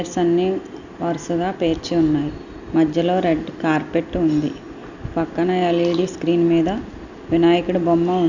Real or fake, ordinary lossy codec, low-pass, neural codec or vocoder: real; none; 7.2 kHz; none